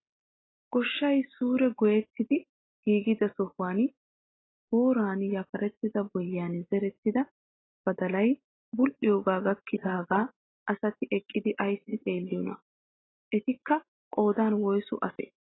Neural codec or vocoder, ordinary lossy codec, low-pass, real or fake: none; AAC, 16 kbps; 7.2 kHz; real